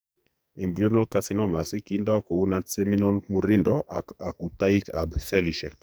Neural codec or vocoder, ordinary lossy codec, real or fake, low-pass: codec, 44.1 kHz, 2.6 kbps, SNAC; none; fake; none